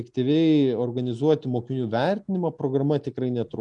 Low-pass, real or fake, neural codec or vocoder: 10.8 kHz; real; none